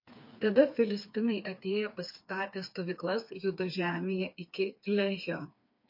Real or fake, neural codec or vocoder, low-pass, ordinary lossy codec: fake; codec, 16 kHz, 4 kbps, FreqCodec, smaller model; 5.4 kHz; MP3, 32 kbps